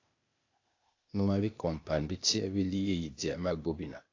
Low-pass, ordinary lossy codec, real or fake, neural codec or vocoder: 7.2 kHz; AAC, 48 kbps; fake; codec, 16 kHz, 0.8 kbps, ZipCodec